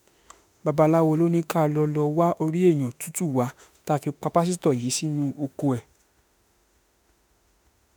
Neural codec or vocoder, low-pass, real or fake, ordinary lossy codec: autoencoder, 48 kHz, 32 numbers a frame, DAC-VAE, trained on Japanese speech; none; fake; none